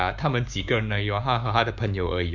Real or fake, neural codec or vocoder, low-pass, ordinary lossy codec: fake; codec, 16 kHz, 6 kbps, DAC; 7.2 kHz; none